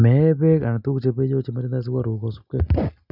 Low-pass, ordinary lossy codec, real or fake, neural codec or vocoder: 5.4 kHz; none; real; none